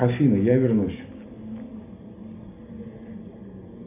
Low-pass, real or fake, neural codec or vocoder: 3.6 kHz; real; none